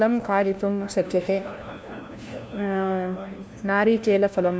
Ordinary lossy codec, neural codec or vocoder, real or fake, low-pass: none; codec, 16 kHz, 1 kbps, FunCodec, trained on LibriTTS, 50 frames a second; fake; none